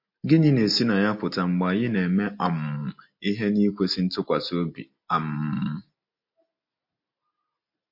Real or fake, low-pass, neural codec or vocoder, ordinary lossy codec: real; 5.4 kHz; none; MP3, 32 kbps